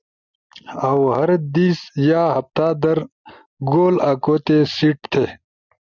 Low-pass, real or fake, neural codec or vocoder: 7.2 kHz; real; none